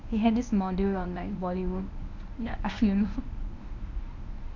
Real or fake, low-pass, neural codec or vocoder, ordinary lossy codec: fake; 7.2 kHz; codec, 24 kHz, 0.9 kbps, WavTokenizer, medium speech release version 1; none